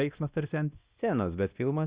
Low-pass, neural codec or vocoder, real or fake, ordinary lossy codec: 3.6 kHz; codec, 16 kHz, 1 kbps, X-Codec, WavLM features, trained on Multilingual LibriSpeech; fake; Opus, 64 kbps